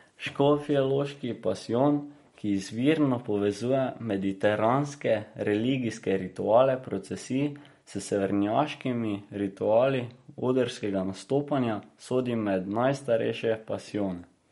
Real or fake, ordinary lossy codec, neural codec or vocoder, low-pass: real; MP3, 48 kbps; none; 19.8 kHz